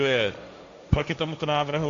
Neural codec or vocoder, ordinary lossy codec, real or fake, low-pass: codec, 16 kHz, 1.1 kbps, Voila-Tokenizer; AAC, 64 kbps; fake; 7.2 kHz